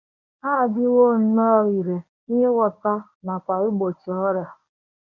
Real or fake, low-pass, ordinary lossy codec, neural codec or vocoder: fake; 7.2 kHz; none; codec, 24 kHz, 0.9 kbps, WavTokenizer, medium speech release version 1